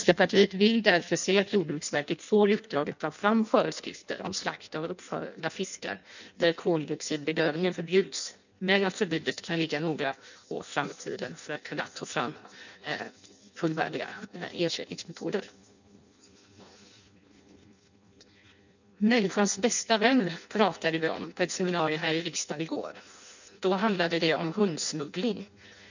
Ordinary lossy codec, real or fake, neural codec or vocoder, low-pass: none; fake; codec, 16 kHz in and 24 kHz out, 0.6 kbps, FireRedTTS-2 codec; 7.2 kHz